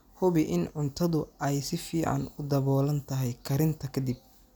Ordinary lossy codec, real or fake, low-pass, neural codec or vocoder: none; real; none; none